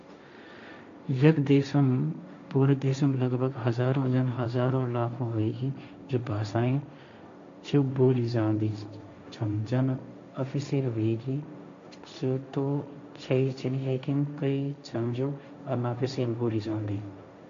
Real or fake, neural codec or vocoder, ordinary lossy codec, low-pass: fake; codec, 16 kHz, 1.1 kbps, Voila-Tokenizer; MP3, 64 kbps; 7.2 kHz